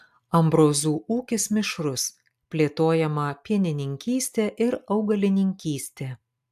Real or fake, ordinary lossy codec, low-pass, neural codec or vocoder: real; AAC, 96 kbps; 14.4 kHz; none